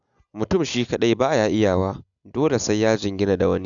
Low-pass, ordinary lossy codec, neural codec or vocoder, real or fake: 7.2 kHz; none; none; real